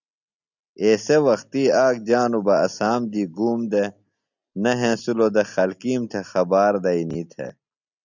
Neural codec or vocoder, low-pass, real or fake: none; 7.2 kHz; real